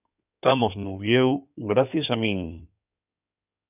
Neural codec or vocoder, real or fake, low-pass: codec, 16 kHz in and 24 kHz out, 2.2 kbps, FireRedTTS-2 codec; fake; 3.6 kHz